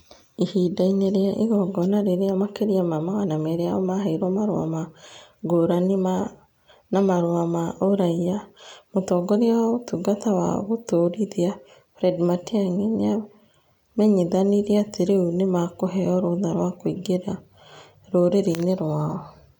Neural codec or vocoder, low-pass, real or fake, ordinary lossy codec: none; 19.8 kHz; real; none